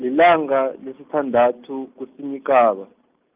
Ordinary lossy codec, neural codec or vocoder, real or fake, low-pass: Opus, 24 kbps; none; real; 3.6 kHz